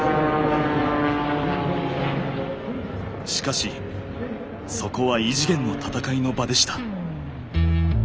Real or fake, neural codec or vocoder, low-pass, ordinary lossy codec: real; none; none; none